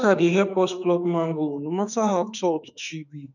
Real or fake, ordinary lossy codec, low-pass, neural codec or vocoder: fake; none; 7.2 kHz; autoencoder, 48 kHz, 32 numbers a frame, DAC-VAE, trained on Japanese speech